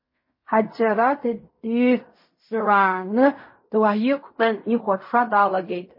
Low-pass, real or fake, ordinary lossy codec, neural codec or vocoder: 5.4 kHz; fake; MP3, 24 kbps; codec, 16 kHz in and 24 kHz out, 0.4 kbps, LongCat-Audio-Codec, fine tuned four codebook decoder